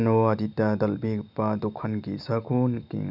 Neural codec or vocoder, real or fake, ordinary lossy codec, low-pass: none; real; none; 5.4 kHz